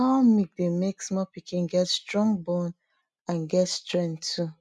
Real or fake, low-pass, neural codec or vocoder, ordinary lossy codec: real; none; none; none